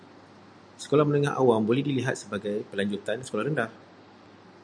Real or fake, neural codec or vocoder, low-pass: real; none; 9.9 kHz